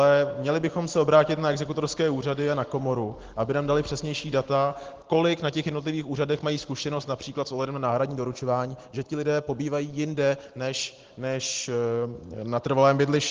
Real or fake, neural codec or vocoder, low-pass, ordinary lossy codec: real; none; 7.2 kHz; Opus, 16 kbps